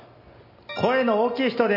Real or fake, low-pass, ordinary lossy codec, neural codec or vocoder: real; 5.4 kHz; AAC, 48 kbps; none